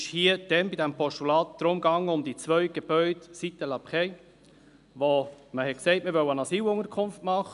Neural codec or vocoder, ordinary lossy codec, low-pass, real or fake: none; none; 10.8 kHz; real